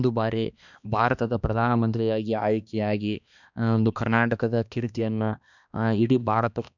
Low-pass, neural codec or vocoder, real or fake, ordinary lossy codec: 7.2 kHz; codec, 16 kHz, 2 kbps, X-Codec, HuBERT features, trained on balanced general audio; fake; none